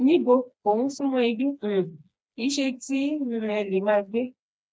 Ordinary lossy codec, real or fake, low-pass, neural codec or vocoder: none; fake; none; codec, 16 kHz, 2 kbps, FreqCodec, smaller model